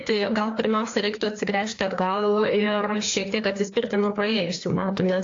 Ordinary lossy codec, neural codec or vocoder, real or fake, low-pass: AAC, 48 kbps; codec, 16 kHz, 2 kbps, FreqCodec, larger model; fake; 7.2 kHz